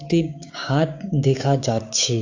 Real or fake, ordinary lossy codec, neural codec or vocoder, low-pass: fake; AAC, 48 kbps; codec, 16 kHz, 6 kbps, DAC; 7.2 kHz